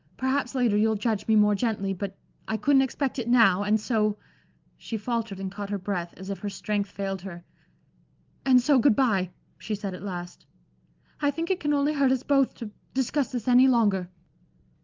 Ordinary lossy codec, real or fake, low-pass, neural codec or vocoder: Opus, 24 kbps; real; 7.2 kHz; none